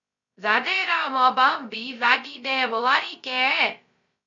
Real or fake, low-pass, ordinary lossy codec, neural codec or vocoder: fake; 7.2 kHz; MP3, 48 kbps; codec, 16 kHz, 0.2 kbps, FocalCodec